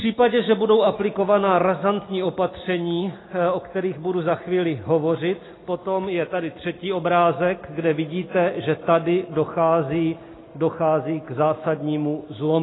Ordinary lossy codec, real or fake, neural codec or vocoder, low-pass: AAC, 16 kbps; real; none; 7.2 kHz